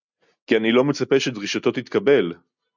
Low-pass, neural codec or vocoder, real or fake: 7.2 kHz; none; real